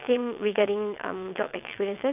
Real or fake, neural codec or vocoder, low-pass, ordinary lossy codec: fake; vocoder, 22.05 kHz, 80 mel bands, WaveNeXt; 3.6 kHz; none